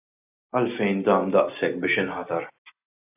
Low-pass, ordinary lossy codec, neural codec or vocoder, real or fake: 3.6 kHz; AAC, 32 kbps; none; real